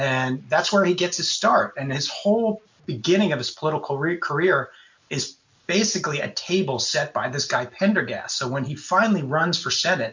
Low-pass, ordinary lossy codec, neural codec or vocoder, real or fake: 7.2 kHz; MP3, 64 kbps; none; real